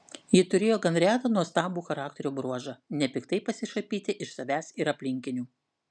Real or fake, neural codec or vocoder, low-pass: real; none; 9.9 kHz